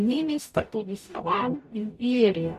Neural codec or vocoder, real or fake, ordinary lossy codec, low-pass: codec, 44.1 kHz, 0.9 kbps, DAC; fake; none; 14.4 kHz